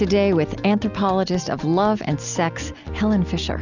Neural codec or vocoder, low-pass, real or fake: none; 7.2 kHz; real